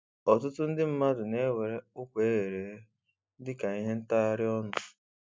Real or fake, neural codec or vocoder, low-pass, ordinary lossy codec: real; none; none; none